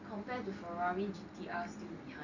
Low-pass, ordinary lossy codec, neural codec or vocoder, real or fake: 7.2 kHz; none; none; real